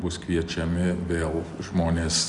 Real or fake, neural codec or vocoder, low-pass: real; none; 10.8 kHz